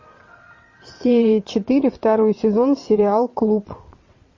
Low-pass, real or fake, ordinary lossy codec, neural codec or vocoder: 7.2 kHz; fake; MP3, 32 kbps; vocoder, 22.05 kHz, 80 mel bands, WaveNeXt